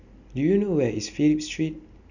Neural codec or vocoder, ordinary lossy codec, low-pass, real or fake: none; none; 7.2 kHz; real